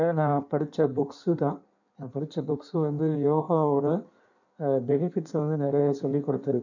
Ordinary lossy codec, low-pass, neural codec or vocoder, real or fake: none; 7.2 kHz; codec, 16 kHz in and 24 kHz out, 1.1 kbps, FireRedTTS-2 codec; fake